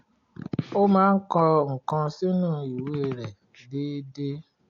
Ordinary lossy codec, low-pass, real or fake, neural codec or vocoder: AAC, 48 kbps; 7.2 kHz; real; none